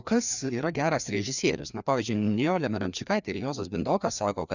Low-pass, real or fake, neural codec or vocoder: 7.2 kHz; fake; codec, 16 kHz, 2 kbps, FreqCodec, larger model